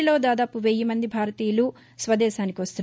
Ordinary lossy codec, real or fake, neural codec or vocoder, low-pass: none; real; none; none